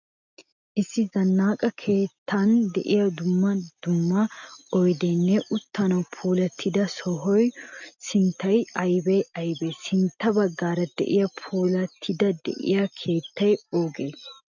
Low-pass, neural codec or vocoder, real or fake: 7.2 kHz; none; real